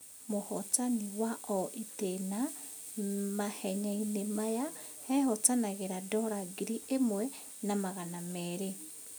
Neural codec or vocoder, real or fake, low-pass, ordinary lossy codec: none; real; none; none